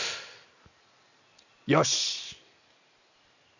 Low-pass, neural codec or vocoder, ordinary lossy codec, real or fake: 7.2 kHz; none; none; real